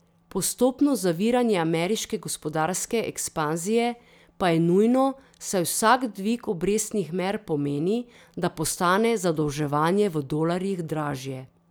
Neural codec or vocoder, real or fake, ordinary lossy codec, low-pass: none; real; none; none